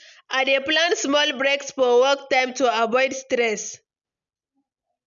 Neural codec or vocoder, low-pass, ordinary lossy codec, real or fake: none; 7.2 kHz; MP3, 96 kbps; real